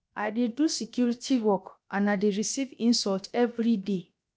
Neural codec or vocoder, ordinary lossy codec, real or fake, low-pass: codec, 16 kHz, 0.7 kbps, FocalCodec; none; fake; none